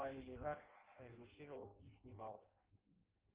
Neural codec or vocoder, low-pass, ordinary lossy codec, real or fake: codec, 16 kHz in and 24 kHz out, 0.6 kbps, FireRedTTS-2 codec; 3.6 kHz; Opus, 16 kbps; fake